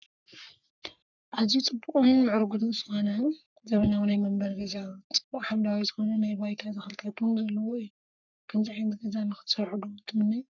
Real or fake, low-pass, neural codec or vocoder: fake; 7.2 kHz; codec, 44.1 kHz, 3.4 kbps, Pupu-Codec